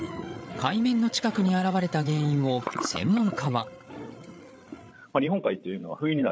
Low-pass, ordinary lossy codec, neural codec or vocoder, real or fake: none; none; codec, 16 kHz, 8 kbps, FreqCodec, larger model; fake